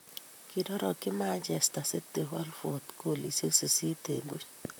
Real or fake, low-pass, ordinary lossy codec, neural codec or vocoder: fake; none; none; vocoder, 44.1 kHz, 128 mel bands, Pupu-Vocoder